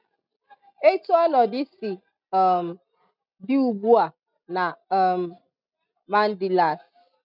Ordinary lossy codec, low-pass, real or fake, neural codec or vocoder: none; 5.4 kHz; real; none